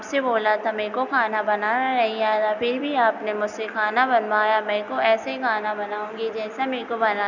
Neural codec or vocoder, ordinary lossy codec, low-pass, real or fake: none; none; 7.2 kHz; real